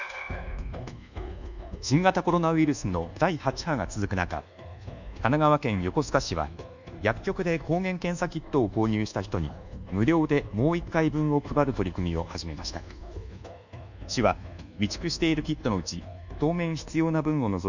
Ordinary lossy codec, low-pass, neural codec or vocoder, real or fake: none; 7.2 kHz; codec, 24 kHz, 1.2 kbps, DualCodec; fake